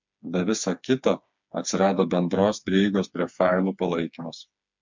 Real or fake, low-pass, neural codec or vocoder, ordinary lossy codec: fake; 7.2 kHz; codec, 16 kHz, 4 kbps, FreqCodec, smaller model; MP3, 64 kbps